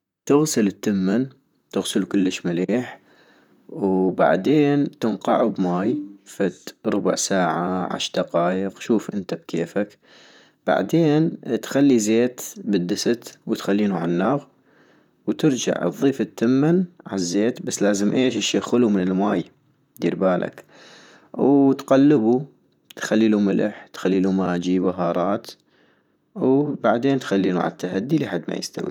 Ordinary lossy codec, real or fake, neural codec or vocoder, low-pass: none; fake; vocoder, 44.1 kHz, 128 mel bands, Pupu-Vocoder; 19.8 kHz